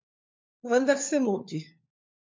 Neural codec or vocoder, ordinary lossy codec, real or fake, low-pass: codec, 16 kHz, 4 kbps, FunCodec, trained on LibriTTS, 50 frames a second; MP3, 48 kbps; fake; 7.2 kHz